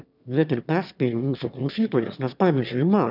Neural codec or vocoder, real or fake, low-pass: autoencoder, 22.05 kHz, a latent of 192 numbers a frame, VITS, trained on one speaker; fake; 5.4 kHz